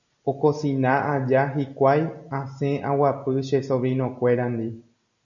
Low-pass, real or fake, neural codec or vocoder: 7.2 kHz; real; none